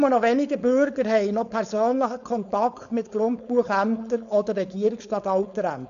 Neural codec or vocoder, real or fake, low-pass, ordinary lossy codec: codec, 16 kHz, 4.8 kbps, FACodec; fake; 7.2 kHz; none